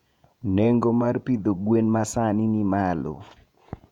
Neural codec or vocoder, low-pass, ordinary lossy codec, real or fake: vocoder, 48 kHz, 128 mel bands, Vocos; 19.8 kHz; none; fake